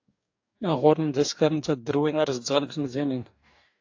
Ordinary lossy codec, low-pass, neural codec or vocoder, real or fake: AAC, 48 kbps; 7.2 kHz; codec, 44.1 kHz, 2.6 kbps, DAC; fake